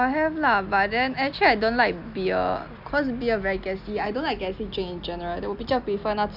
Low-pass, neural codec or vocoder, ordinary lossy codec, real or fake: 5.4 kHz; none; none; real